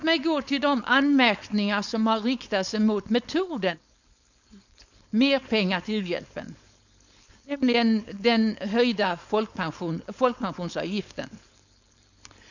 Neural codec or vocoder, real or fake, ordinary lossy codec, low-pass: codec, 16 kHz, 4.8 kbps, FACodec; fake; none; 7.2 kHz